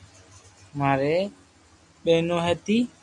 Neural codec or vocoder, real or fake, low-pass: none; real; 10.8 kHz